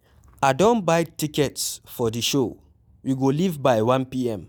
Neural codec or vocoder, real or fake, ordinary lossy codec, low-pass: none; real; none; none